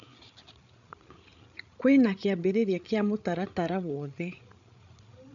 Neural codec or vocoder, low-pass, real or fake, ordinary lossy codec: codec, 16 kHz, 8 kbps, FreqCodec, larger model; 7.2 kHz; fake; MP3, 96 kbps